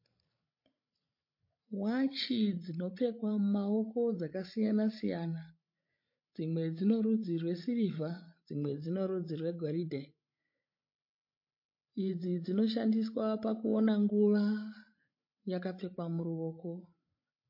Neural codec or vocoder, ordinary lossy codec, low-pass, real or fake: codec, 16 kHz, 16 kbps, FreqCodec, larger model; MP3, 32 kbps; 5.4 kHz; fake